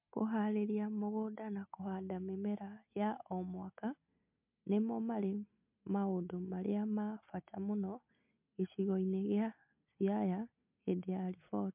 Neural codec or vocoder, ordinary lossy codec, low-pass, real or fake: none; MP3, 32 kbps; 3.6 kHz; real